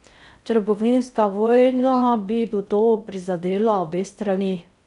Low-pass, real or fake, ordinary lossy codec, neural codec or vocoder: 10.8 kHz; fake; none; codec, 16 kHz in and 24 kHz out, 0.6 kbps, FocalCodec, streaming, 4096 codes